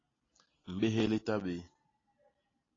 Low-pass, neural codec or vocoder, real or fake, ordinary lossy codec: 7.2 kHz; none; real; AAC, 32 kbps